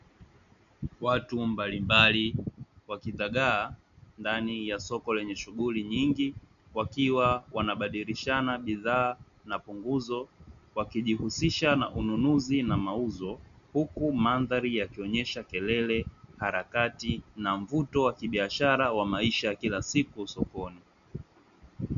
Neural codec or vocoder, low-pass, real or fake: none; 7.2 kHz; real